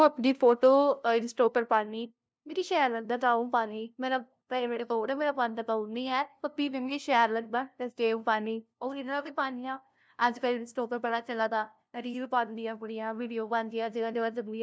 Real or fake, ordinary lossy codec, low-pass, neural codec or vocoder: fake; none; none; codec, 16 kHz, 0.5 kbps, FunCodec, trained on LibriTTS, 25 frames a second